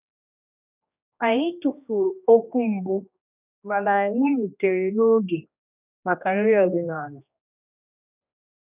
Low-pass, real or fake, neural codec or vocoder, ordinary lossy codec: 3.6 kHz; fake; codec, 16 kHz, 1 kbps, X-Codec, HuBERT features, trained on general audio; none